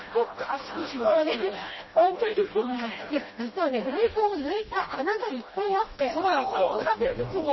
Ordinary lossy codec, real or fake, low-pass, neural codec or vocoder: MP3, 24 kbps; fake; 7.2 kHz; codec, 16 kHz, 1 kbps, FreqCodec, smaller model